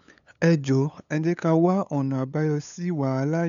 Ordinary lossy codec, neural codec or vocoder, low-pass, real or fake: none; codec, 16 kHz, 8 kbps, FunCodec, trained on LibriTTS, 25 frames a second; 7.2 kHz; fake